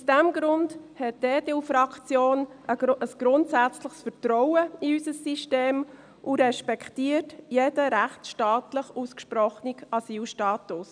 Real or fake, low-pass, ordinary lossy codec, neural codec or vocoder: real; 9.9 kHz; none; none